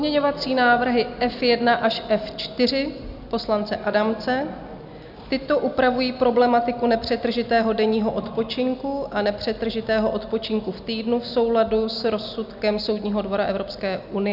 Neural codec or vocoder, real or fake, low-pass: none; real; 5.4 kHz